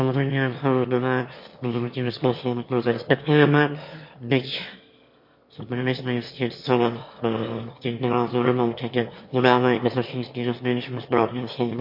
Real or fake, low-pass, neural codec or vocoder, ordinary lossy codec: fake; 5.4 kHz; autoencoder, 22.05 kHz, a latent of 192 numbers a frame, VITS, trained on one speaker; MP3, 32 kbps